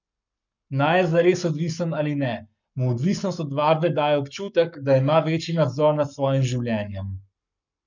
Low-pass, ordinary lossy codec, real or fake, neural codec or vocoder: 7.2 kHz; none; fake; codec, 44.1 kHz, 7.8 kbps, Pupu-Codec